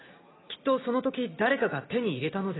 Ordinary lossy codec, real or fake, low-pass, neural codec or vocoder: AAC, 16 kbps; real; 7.2 kHz; none